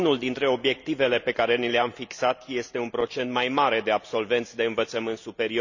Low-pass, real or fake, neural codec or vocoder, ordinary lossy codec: 7.2 kHz; real; none; none